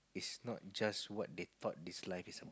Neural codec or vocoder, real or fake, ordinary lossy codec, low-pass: none; real; none; none